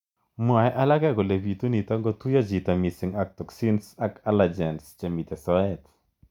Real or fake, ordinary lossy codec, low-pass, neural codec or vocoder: real; none; 19.8 kHz; none